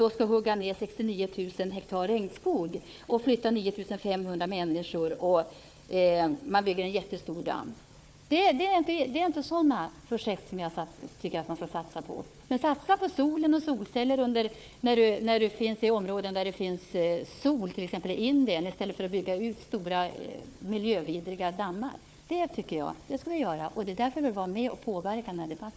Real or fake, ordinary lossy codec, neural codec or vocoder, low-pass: fake; none; codec, 16 kHz, 4 kbps, FunCodec, trained on Chinese and English, 50 frames a second; none